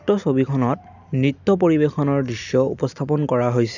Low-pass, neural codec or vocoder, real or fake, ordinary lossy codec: 7.2 kHz; none; real; none